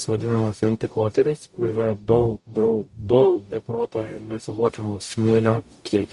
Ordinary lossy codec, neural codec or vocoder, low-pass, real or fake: MP3, 48 kbps; codec, 44.1 kHz, 0.9 kbps, DAC; 14.4 kHz; fake